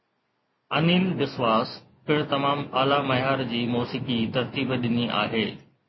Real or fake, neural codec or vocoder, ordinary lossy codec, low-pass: real; none; MP3, 24 kbps; 7.2 kHz